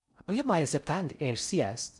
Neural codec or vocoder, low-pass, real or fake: codec, 16 kHz in and 24 kHz out, 0.6 kbps, FocalCodec, streaming, 4096 codes; 10.8 kHz; fake